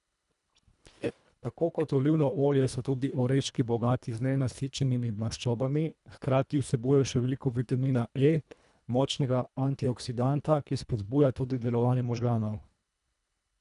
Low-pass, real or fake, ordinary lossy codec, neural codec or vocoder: 10.8 kHz; fake; none; codec, 24 kHz, 1.5 kbps, HILCodec